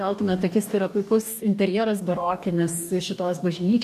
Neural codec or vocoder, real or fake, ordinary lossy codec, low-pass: codec, 44.1 kHz, 2.6 kbps, DAC; fake; MP3, 96 kbps; 14.4 kHz